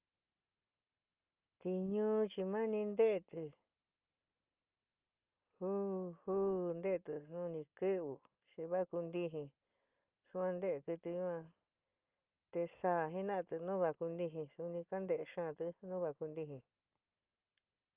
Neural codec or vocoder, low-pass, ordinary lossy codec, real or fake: none; 3.6 kHz; Opus, 24 kbps; real